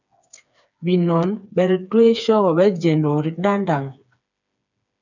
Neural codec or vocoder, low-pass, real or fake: codec, 16 kHz, 4 kbps, FreqCodec, smaller model; 7.2 kHz; fake